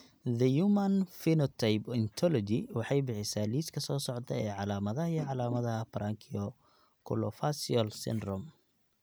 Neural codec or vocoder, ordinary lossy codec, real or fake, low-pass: none; none; real; none